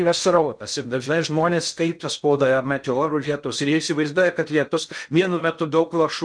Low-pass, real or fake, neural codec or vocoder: 9.9 kHz; fake; codec, 16 kHz in and 24 kHz out, 0.6 kbps, FocalCodec, streaming, 2048 codes